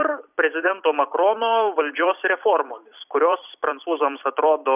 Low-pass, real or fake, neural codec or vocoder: 3.6 kHz; fake; vocoder, 44.1 kHz, 128 mel bands every 256 samples, BigVGAN v2